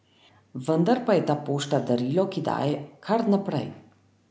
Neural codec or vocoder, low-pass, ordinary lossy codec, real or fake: none; none; none; real